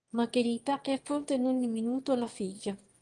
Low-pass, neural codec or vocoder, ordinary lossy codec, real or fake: 9.9 kHz; autoencoder, 22.05 kHz, a latent of 192 numbers a frame, VITS, trained on one speaker; Opus, 24 kbps; fake